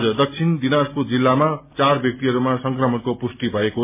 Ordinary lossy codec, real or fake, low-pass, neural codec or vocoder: MP3, 24 kbps; real; 3.6 kHz; none